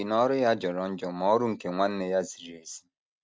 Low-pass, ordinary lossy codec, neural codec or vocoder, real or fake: none; none; none; real